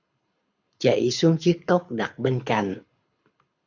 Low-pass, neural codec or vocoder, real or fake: 7.2 kHz; codec, 24 kHz, 6 kbps, HILCodec; fake